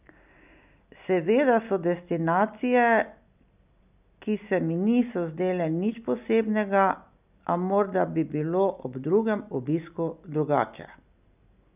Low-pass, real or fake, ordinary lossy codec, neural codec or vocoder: 3.6 kHz; real; none; none